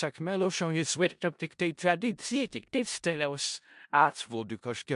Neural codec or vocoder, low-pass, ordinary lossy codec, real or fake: codec, 16 kHz in and 24 kHz out, 0.4 kbps, LongCat-Audio-Codec, four codebook decoder; 10.8 kHz; MP3, 64 kbps; fake